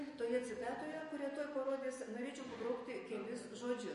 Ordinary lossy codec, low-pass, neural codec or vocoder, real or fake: MP3, 48 kbps; 14.4 kHz; none; real